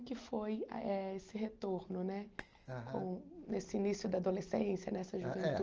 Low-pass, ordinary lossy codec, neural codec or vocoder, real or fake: 7.2 kHz; Opus, 24 kbps; none; real